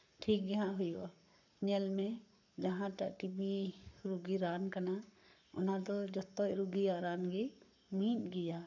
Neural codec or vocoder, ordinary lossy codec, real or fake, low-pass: codec, 44.1 kHz, 7.8 kbps, Pupu-Codec; none; fake; 7.2 kHz